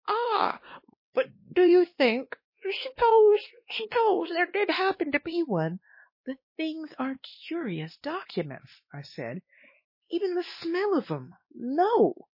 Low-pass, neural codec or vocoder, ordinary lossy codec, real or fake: 5.4 kHz; codec, 16 kHz, 2 kbps, X-Codec, WavLM features, trained on Multilingual LibriSpeech; MP3, 24 kbps; fake